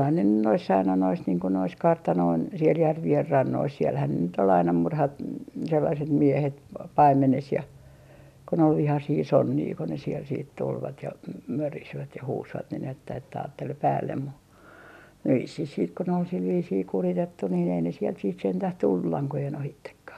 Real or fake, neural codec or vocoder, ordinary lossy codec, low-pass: real; none; none; 14.4 kHz